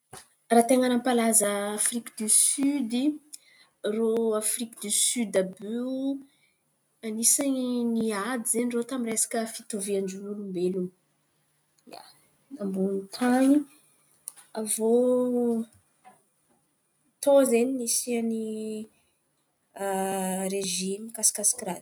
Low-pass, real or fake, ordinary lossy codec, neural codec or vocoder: none; real; none; none